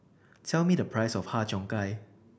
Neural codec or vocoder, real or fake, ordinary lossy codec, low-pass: none; real; none; none